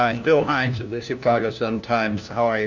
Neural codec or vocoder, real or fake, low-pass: codec, 16 kHz, 1 kbps, FunCodec, trained on LibriTTS, 50 frames a second; fake; 7.2 kHz